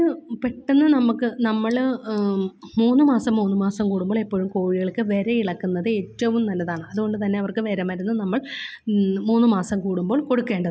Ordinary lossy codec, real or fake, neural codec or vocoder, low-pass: none; real; none; none